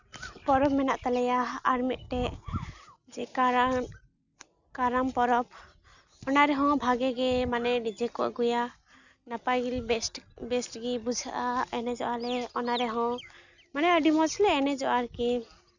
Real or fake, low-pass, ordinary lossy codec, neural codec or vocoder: real; 7.2 kHz; none; none